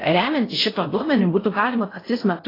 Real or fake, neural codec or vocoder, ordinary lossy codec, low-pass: fake; codec, 16 kHz in and 24 kHz out, 0.6 kbps, FocalCodec, streaming, 4096 codes; AAC, 24 kbps; 5.4 kHz